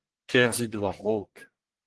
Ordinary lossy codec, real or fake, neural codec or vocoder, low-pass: Opus, 16 kbps; fake; codec, 44.1 kHz, 1.7 kbps, Pupu-Codec; 10.8 kHz